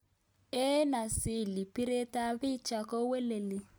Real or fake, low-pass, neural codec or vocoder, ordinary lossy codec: real; none; none; none